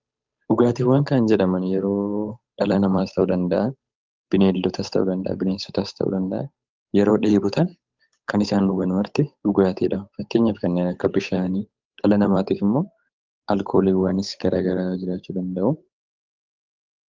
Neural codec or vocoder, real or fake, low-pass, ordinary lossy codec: codec, 16 kHz, 8 kbps, FunCodec, trained on Chinese and English, 25 frames a second; fake; 7.2 kHz; Opus, 24 kbps